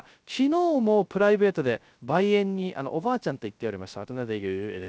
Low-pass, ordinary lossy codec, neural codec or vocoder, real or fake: none; none; codec, 16 kHz, 0.3 kbps, FocalCodec; fake